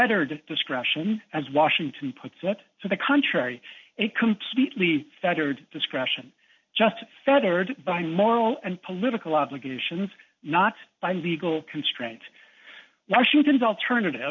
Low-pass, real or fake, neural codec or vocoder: 7.2 kHz; real; none